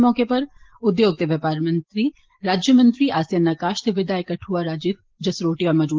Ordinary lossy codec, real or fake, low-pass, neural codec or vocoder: Opus, 16 kbps; real; 7.2 kHz; none